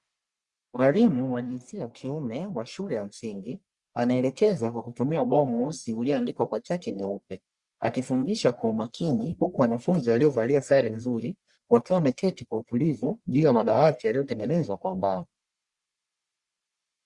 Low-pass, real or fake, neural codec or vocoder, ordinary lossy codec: 10.8 kHz; fake; codec, 44.1 kHz, 1.7 kbps, Pupu-Codec; Opus, 64 kbps